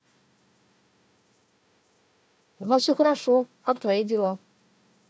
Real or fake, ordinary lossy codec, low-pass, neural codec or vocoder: fake; none; none; codec, 16 kHz, 1 kbps, FunCodec, trained on Chinese and English, 50 frames a second